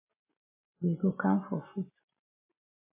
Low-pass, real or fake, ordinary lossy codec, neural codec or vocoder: 3.6 kHz; real; MP3, 16 kbps; none